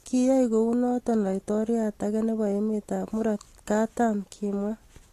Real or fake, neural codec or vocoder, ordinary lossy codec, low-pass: real; none; AAC, 48 kbps; 14.4 kHz